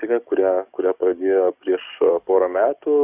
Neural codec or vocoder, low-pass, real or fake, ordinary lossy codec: codec, 44.1 kHz, 7.8 kbps, DAC; 3.6 kHz; fake; Opus, 64 kbps